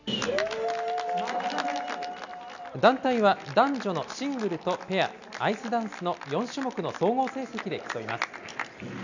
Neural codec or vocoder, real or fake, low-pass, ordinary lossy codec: none; real; 7.2 kHz; none